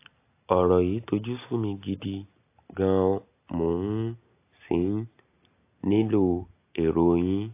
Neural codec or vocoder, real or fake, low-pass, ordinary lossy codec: none; real; 3.6 kHz; AAC, 24 kbps